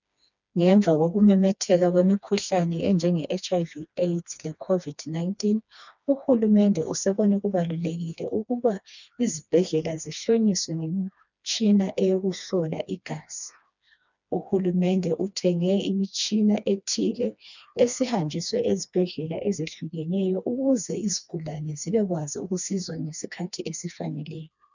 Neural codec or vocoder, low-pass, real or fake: codec, 16 kHz, 2 kbps, FreqCodec, smaller model; 7.2 kHz; fake